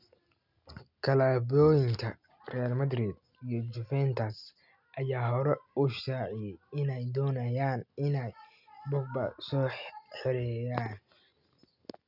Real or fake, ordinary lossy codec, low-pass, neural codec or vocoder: real; none; 5.4 kHz; none